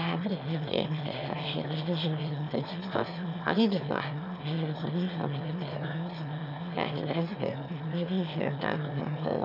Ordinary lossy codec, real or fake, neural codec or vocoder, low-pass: none; fake; autoencoder, 22.05 kHz, a latent of 192 numbers a frame, VITS, trained on one speaker; 5.4 kHz